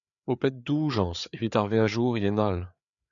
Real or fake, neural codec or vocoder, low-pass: fake; codec, 16 kHz, 4 kbps, FreqCodec, larger model; 7.2 kHz